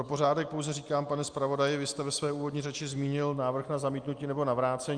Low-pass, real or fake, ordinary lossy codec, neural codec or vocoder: 9.9 kHz; real; Opus, 32 kbps; none